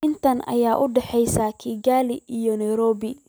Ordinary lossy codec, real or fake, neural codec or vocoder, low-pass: none; real; none; none